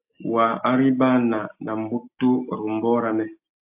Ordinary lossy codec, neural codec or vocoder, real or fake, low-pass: AAC, 32 kbps; none; real; 3.6 kHz